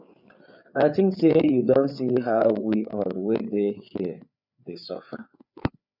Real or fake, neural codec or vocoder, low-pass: fake; codec, 16 kHz, 4 kbps, FreqCodec, larger model; 5.4 kHz